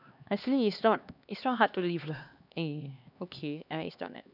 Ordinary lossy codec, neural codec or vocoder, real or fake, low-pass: none; codec, 16 kHz, 2 kbps, X-Codec, HuBERT features, trained on LibriSpeech; fake; 5.4 kHz